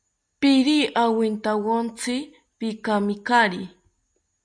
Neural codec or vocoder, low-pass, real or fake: none; 9.9 kHz; real